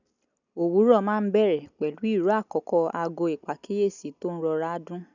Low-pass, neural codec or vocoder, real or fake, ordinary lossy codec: 7.2 kHz; none; real; none